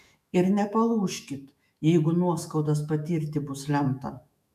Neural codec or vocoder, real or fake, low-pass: autoencoder, 48 kHz, 128 numbers a frame, DAC-VAE, trained on Japanese speech; fake; 14.4 kHz